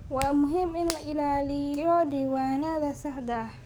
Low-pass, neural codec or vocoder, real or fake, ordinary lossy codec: none; codec, 44.1 kHz, 7.8 kbps, DAC; fake; none